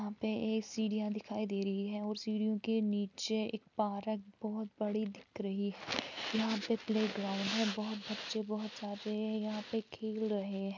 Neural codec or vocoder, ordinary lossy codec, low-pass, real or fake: none; none; 7.2 kHz; real